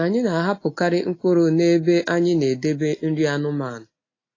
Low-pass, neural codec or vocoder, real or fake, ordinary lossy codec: 7.2 kHz; none; real; AAC, 32 kbps